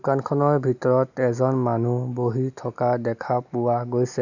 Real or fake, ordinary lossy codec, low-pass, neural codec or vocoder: real; none; 7.2 kHz; none